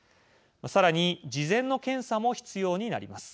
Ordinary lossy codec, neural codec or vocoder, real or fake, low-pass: none; none; real; none